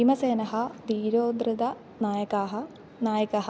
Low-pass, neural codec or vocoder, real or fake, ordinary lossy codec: none; none; real; none